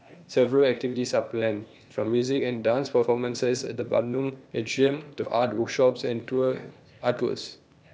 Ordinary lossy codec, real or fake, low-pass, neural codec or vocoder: none; fake; none; codec, 16 kHz, 0.8 kbps, ZipCodec